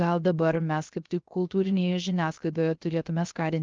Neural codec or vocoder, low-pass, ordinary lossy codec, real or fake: codec, 16 kHz, 0.3 kbps, FocalCodec; 7.2 kHz; Opus, 24 kbps; fake